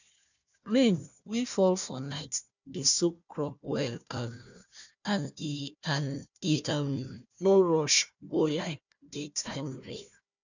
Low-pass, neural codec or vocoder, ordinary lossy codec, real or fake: 7.2 kHz; codec, 16 kHz, 1 kbps, FunCodec, trained on Chinese and English, 50 frames a second; none; fake